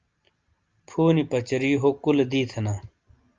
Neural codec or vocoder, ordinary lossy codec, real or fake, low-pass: none; Opus, 24 kbps; real; 7.2 kHz